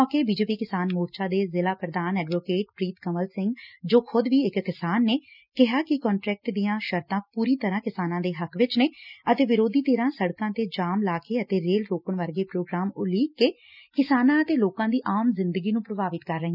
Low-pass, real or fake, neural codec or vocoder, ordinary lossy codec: 5.4 kHz; real; none; none